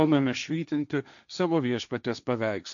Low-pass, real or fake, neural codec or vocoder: 7.2 kHz; fake; codec, 16 kHz, 1.1 kbps, Voila-Tokenizer